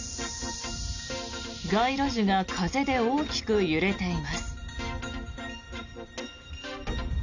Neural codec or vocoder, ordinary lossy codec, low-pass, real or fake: none; none; 7.2 kHz; real